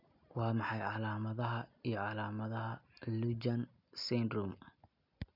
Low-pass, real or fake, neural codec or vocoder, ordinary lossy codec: 5.4 kHz; real; none; none